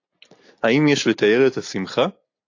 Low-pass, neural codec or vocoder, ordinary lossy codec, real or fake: 7.2 kHz; none; AAC, 48 kbps; real